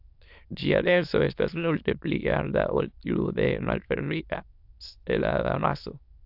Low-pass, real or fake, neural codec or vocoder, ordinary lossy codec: 5.4 kHz; fake; autoencoder, 22.05 kHz, a latent of 192 numbers a frame, VITS, trained on many speakers; AAC, 48 kbps